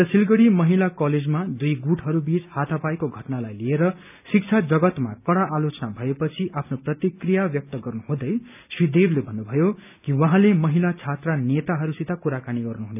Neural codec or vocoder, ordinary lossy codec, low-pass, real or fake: none; none; 3.6 kHz; real